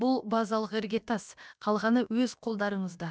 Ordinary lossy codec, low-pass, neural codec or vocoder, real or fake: none; none; codec, 16 kHz, about 1 kbps, DyCAST, with the encoder's durations; fake